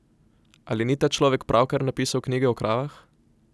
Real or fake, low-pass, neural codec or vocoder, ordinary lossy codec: real; none; none; none